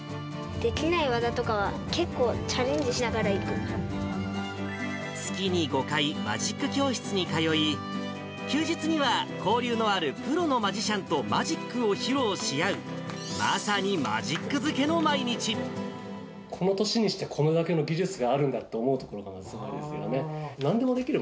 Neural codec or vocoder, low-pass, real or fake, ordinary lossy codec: none; none; real; none